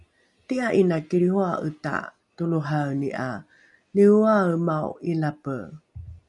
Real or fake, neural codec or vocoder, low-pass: real; none; 10.8 kHz